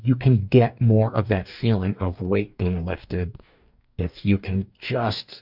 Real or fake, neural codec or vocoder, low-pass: fake; codec, 44.1 kHz, 2.6 kbps, DAC; 5.4 kHz